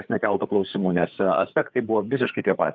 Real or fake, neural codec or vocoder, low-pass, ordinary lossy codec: fake; codec, 16 kHz, 4 kbps, FreqCodec, larger model; 7.2 kHz; Opus, 24 kbps